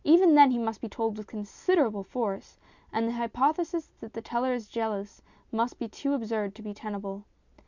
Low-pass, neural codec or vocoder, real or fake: 7.2 kHz; none; real